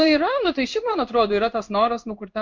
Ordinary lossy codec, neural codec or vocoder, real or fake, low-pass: MP3, 48 kbps; codec, 16 kHz in and 24 kHz out, 1 kbps, XY-Tokenizer; fake; 7.2 kHz